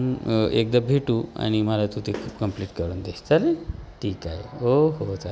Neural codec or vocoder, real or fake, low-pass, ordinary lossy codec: none; real; none; none